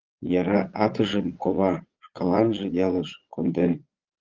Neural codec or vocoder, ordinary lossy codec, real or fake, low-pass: vocoder, 22.05 kHz, 80 mel bands, Vocos; Opus, 32 kbps; fake; 7.2 kHz